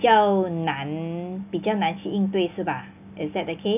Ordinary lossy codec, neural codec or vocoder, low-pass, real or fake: none; none; 3.6 kHz; real